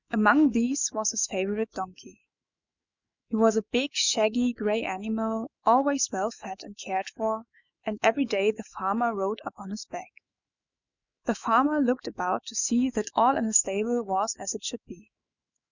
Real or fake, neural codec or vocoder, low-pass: fake; autoencoder, 48 kHz, 128 numbers a frame, DAC-VAE, trained on Japanese speech; 7.2 kHz